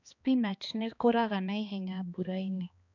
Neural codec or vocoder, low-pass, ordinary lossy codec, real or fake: codec, 16 kHz, 2 kbps, X-Codec, HuBERT features, trained on balanced general audio; 7.2 kHz; none; fake